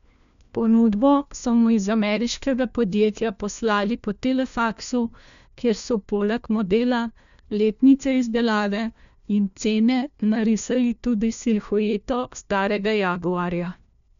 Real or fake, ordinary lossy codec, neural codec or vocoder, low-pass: fake; none; codec, 16 kHz, 1 kbps, FunCodec, trained on LibriTTS, 50 frames a second; 7.2 kHz